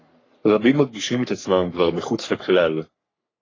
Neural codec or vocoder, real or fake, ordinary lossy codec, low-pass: codec, 44.1 kHz, 3.4 kbps, Pupu-Codec; fake; AAC, 32 kbps; 7.2 kHz